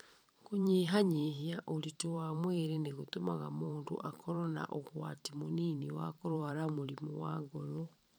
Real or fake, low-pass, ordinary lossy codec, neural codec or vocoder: fake; none; none; vocoder, 44.1 kHz, 128 mel bands every 512 samples, BigVGAN v2